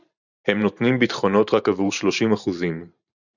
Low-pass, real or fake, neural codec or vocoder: 7.2 kHz; fake; vocoder, 44.1 kHz, 128 mel bands every 512 samples, BigVGAN v2